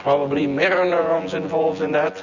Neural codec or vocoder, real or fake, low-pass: vocoder, 24 kHz, 100 mel bands, Vocos; fake; 7.2 kHz